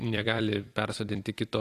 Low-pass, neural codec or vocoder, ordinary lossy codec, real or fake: 14.4 kHz; vocoder, 44.1 kHz, 128 mel bands every 256 samples, BigVGAN v2; AAC, 48 kbps; fake